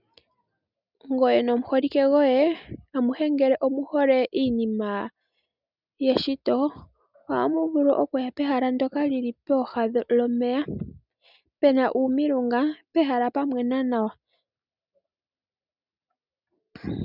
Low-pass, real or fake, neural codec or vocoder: 5.4 kHz; real; none